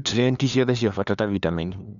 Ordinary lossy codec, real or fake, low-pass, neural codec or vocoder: none; fake; 7.2 kHz; codec, 16 kHz, 2 kbps, FunCodec, trained on LibriTTS, 25 frames a second